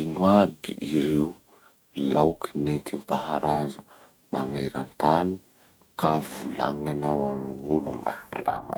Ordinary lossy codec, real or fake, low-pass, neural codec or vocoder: none; fake; none; codec, 44.1 kHz, 2.6 kbps, DAC